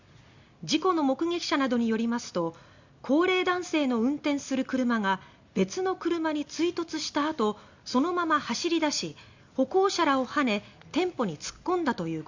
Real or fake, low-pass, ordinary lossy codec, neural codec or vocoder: real; 7.2 kHz; Opus, 64 kbps; none